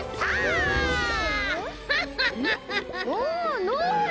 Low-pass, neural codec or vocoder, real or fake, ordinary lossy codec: none; none; real; none